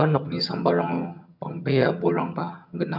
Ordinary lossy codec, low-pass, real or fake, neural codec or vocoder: none; 5.4 kHz; fake; vocoder, 22.05 kHz, 80 mel bands, HiFi-GAN